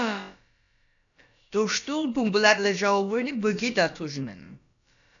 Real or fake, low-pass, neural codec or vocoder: fake; 7.2 kHz; codec, 16 kHz, about 1 kbps, DyCAST, with the encoder's durations